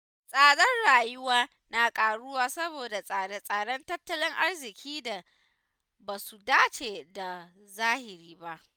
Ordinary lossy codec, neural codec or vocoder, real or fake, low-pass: none; none; real; none